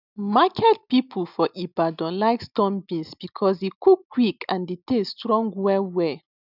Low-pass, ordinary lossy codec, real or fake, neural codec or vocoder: 5.4 kHz; none; real; none